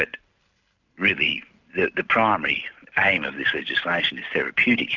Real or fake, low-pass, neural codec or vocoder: real; 7.2 kHz; none